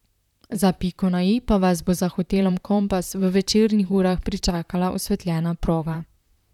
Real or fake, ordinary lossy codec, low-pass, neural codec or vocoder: fake; none; 19.8 kHz; vocoder, 44.1 kHz, 128 mel bands, Pupu-Vocoder